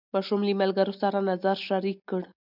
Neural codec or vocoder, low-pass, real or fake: none; 5.4 kHz; real